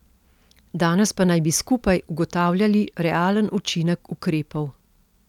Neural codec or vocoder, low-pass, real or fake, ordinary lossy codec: none; 19.8 kHz; real; none